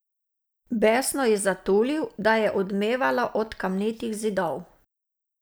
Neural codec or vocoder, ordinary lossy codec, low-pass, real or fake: none; none; none; real